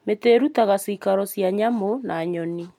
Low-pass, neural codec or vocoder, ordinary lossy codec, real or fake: 19.8 kHz; none; MP3, 96 kbps; real